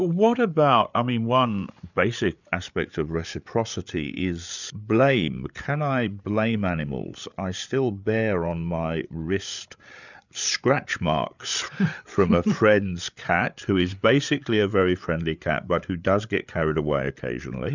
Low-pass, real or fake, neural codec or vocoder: 7.2 kHz; fake; codec, 16 kHz, 8 kbps, FreqCodec, larger model